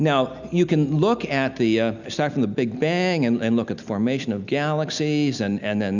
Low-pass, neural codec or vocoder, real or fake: 7.2 kHz; none; real